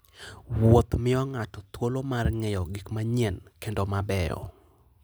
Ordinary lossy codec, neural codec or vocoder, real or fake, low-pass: none; none; real; none